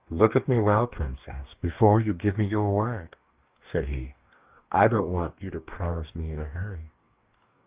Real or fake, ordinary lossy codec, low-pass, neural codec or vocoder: fake; Opus, 32 kbps; 3.6 kHz; codec, 44.1 kHz, 2.6 kbps, DAC